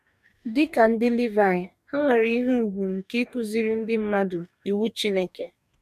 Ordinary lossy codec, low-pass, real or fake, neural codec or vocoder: none; 14.4 kHz; fake; codec, 44.1 kHz, 2.6 kbps, DAC